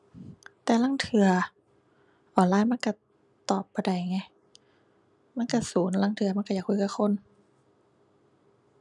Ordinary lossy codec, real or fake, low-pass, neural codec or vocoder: none; real; 10.8 kHz; none